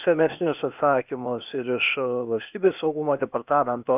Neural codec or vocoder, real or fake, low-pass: codec, 16 kHz, 0.8 kbps, ZipCodec; fake; 3.6 kHz